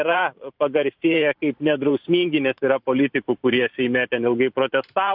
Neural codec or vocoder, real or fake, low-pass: vocoder, 44.1 kHz, 128 mel bands every 512 samples, BigVGAN v2; fake; 5.4 kHz